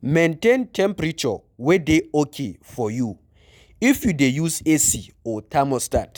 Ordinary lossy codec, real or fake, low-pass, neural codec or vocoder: none; real; none; none